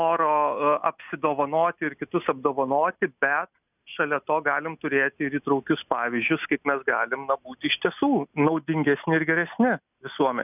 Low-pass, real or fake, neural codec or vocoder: 3.6 kHz; real; none